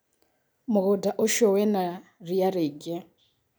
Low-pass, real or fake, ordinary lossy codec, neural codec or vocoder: none; real; none; none